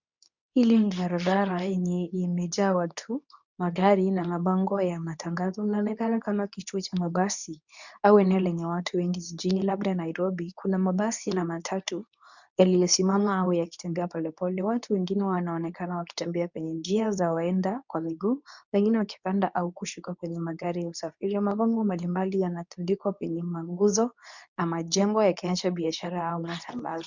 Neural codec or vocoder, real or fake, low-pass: codec, 24 kHz, 0.9 kbps, WavTokenizer, medium speech release version 2; fake; 7.2 kHz